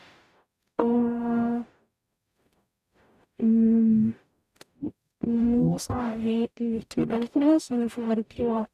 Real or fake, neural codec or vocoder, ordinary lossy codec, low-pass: fake; codec, 44.1 kHz, 0.9 kbps, DAC; none; 14.4 kHz